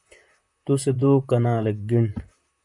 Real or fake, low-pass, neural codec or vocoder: fake; 10.8 kHz; vocoder, 44.1 kHz, 128 mel bands, Pupu-Vocoder